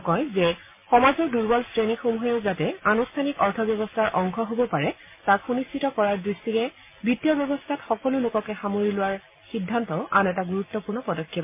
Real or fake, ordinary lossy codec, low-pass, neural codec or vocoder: real; MP3, 24 kbps; 3.6 kHz; none